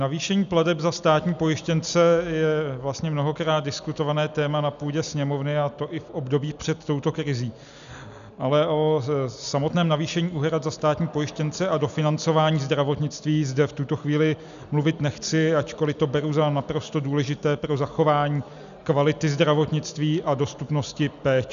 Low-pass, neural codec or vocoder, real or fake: 7.2 kHz; none; real